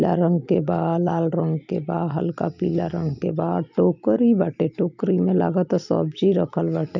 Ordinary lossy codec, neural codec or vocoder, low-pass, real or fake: none; none; 7.2 kHz; real